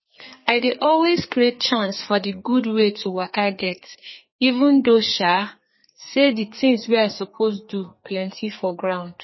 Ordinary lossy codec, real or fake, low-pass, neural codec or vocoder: MP3, 24 kbps; fake; 7.2 kHz; codec, 32 kHz, 1.9 kbps, SNAC